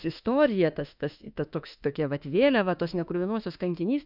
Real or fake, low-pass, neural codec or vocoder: fake; 5.4 kHz; autoencoder, 48 kHz, 32 numbers a frame, DAC-VAE, trained on Japanese speech